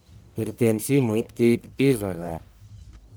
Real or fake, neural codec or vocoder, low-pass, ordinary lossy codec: fake; codec, 44.1 kHz, 1.7 kbps, Pupu-Codec; none; none